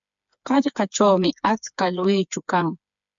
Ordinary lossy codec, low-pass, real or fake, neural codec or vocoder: MP3, 64 kbps; 7.2 kHz; fake; codec, 16 kHz, 4 kbps, FreqCodec, smaller model